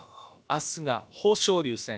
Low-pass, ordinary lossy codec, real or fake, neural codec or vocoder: none; none; fake; codec, 16 kHz, about 1 kbps, DyCAST, with the encoder's durations